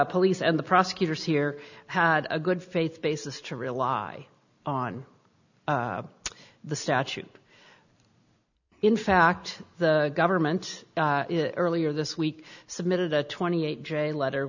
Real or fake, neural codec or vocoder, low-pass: real; none; 7.2 kHz